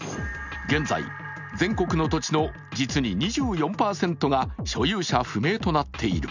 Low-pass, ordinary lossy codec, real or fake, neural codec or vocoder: 7.2 kHz; none; real; none